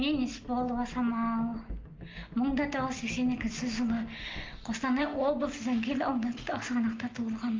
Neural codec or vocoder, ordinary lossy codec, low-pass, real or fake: none; Opus, 32 kbps; 7.2 kHz; real